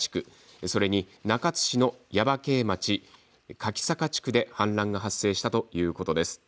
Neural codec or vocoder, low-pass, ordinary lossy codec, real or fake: none; none; none; real